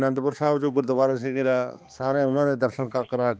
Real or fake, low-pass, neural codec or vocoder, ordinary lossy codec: fake; none; codec, 16 kHz, 2 kbps, X-Codec, HuBERT features, trained on balanced general audio; none